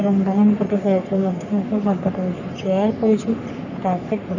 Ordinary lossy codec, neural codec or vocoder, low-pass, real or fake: none; codec, 44.1 kHz, 3.4 kbps, Pupu-Codec; 7.2 kHz; fake